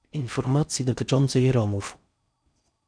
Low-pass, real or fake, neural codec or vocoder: 9.9 kHz; fake; codec, 16 kHz in and 24 kHz out, 0.6 kbps, FocalCodec, streaming, 4096 codes